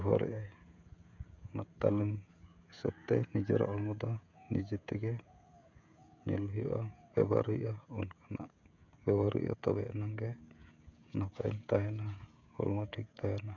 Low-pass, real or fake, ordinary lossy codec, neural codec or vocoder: 7.2 kHz; fake; none; codec, 16 kHz, 16 kbps, FreqCodec, smaller model